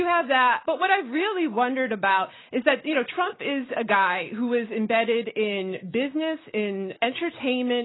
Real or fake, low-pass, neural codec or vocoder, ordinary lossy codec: real; 7.2 kHz; none; AAC, 16 kbps